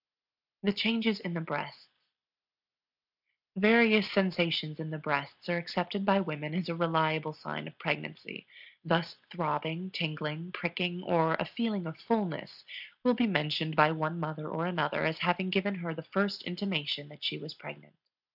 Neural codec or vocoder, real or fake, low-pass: none; real; 5.4 kHz